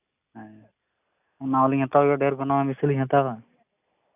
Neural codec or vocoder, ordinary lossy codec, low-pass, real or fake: none; none; 3.6 kHz; real